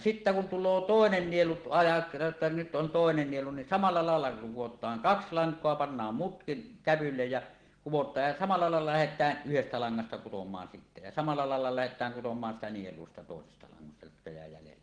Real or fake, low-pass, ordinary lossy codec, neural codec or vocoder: real; 9.9 kHz; Opus, 16 kbps; none